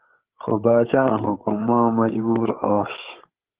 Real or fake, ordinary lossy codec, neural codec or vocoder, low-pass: fake; Opus, 16 kbps; codec, 16 kHz, 8 kbps, FreqCodec, larger model; 3.6 kHz